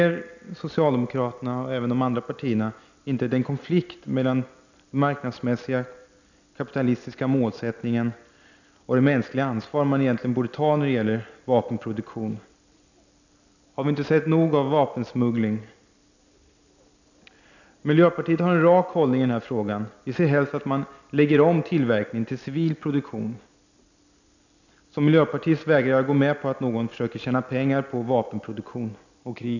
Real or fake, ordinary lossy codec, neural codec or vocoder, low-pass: real; none; none; 7.2 kHz